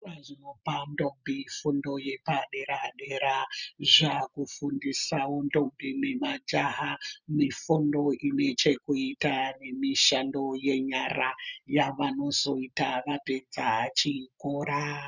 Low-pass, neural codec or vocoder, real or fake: 7.2 kHz; none; real